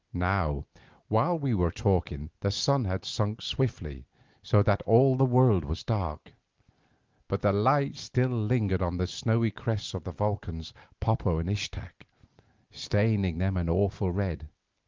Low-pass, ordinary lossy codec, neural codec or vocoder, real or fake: 7.2 kHz; Opus, 32 kbps; none; real